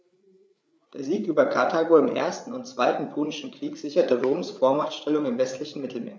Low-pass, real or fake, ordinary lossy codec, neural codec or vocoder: none; fake; none; codec, 16 kHz, 8 kbps, FreqCodec, larger model